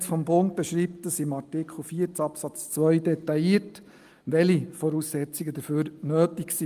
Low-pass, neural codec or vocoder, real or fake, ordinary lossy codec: 14.4 kHz; none; real; Opus, 32 kbps